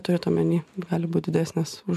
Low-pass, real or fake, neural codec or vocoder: 14.4 kHz; real; none